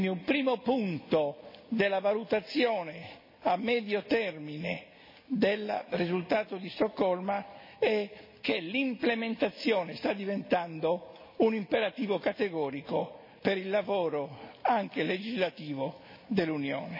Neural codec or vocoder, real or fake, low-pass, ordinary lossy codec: none; real; 5.4 kHz; MP3, 24 kbps